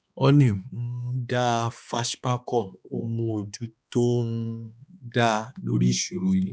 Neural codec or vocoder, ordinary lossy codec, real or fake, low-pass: codec, 16 kHz, 2 kbps, X-Codec, HuBERT features, trained on balanced general audio; none; fake; none